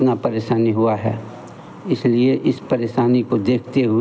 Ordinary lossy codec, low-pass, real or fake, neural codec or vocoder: none; none; real; none